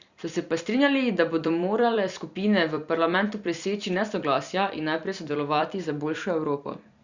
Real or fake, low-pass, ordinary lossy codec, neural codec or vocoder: fake; 7.2 kHz; Opus, 64 kbps; vocoder, 44.1 kHz, 128 mel bands every 256 samples, BigVGAN v2